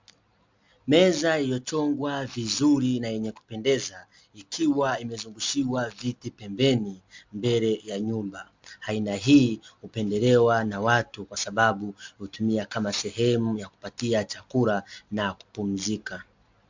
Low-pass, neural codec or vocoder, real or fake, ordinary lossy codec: 7.2 kHz; none; real; MP3, 64 kbps